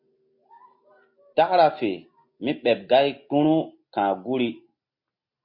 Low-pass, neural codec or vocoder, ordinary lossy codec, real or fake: 5.4 kHz; none; MP3, 32 kbps; real